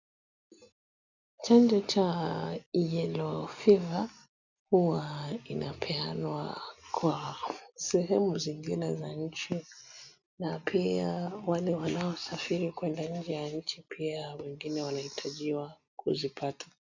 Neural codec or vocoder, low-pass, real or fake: none; 7.2 kHz; real